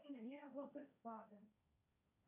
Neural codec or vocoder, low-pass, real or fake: codec, 16 kHz, 1.1 kbps, Voila-Tokenizer; 3.6 kHz; fake